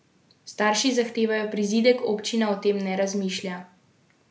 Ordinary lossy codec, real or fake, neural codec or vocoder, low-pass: none; real; none; none